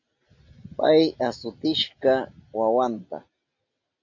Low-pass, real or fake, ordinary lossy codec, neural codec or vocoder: 7.2 kHz; real; MP3, 48 kbps; none